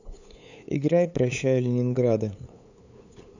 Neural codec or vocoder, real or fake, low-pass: codec, 16 kHz, 8 kbps, FunCodec, trained on LibriTTS, 25 frames a second; fake; 7.2 kHz